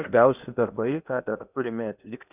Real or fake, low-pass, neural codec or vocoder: fake; 3.6 kHz; codec, 16 kHz in and 24 kHz out, 0.8 kbps, FocalCodec, streaming, 65536 codes